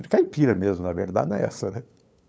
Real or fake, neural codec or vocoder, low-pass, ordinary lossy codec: fake; codec, 16 kHz, 8 kbps, FunCodec, trained on LibriTTS, 25 frames a second; none; none